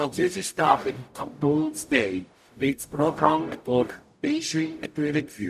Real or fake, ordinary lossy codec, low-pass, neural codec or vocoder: fake; none; 14.4 kHz; codec, 44.1 kHz, 0.9 kbps, DAC